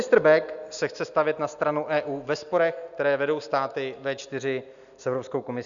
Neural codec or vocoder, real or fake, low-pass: none; real; 7.2 kHz